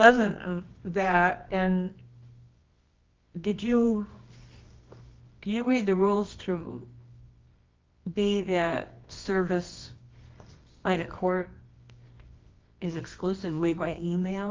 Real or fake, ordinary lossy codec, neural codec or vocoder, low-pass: fake; Opus, 24 kbps; codec, 24 kHz, 0.9 kbps, WavTokenizer, medium music audio release; 7.2 kHz